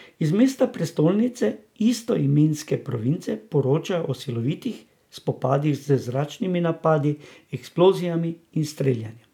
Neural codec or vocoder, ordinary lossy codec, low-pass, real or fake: none; none; 19.8 kHz; real